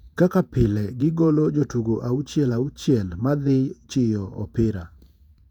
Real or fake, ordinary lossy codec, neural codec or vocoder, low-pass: fake; none; vocoder, 48 kHz, 128 mel bands, Vocos; 19.8 kHz